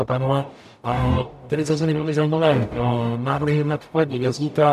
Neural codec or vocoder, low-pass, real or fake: codec, 44.1 kHz, 0.9 kbps, DAC; 14.4 kHz; fake